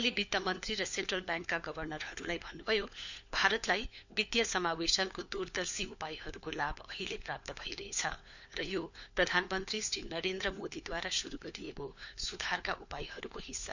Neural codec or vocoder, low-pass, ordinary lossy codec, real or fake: codec, 16 kHz, 4 kbps, FunCodec, trained on LibriTTS, 50 frames a second; 7.2 kHz; none; fake